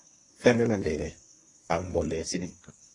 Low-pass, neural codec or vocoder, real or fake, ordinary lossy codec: 10.8 kHz; codec, 24 kHz, 1 kbps, SNAC; fake; AAC, 32 kbps